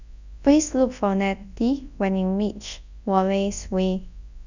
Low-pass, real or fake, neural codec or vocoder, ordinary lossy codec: 7.2 kHz; fake; codec, 24 kHz, 0.9 kbps, WavTokenizer, large speech release; none